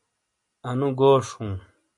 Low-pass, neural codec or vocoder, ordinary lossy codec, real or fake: 10.8 kHz; none; MP3, 48 kbps; real